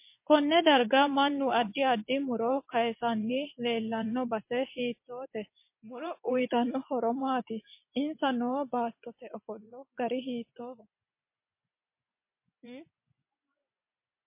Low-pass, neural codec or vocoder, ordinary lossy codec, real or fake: 3.6 kHz; vocoder, 24 kHz, 100 mel bands, Vocos; MP3, 24 kbps; fake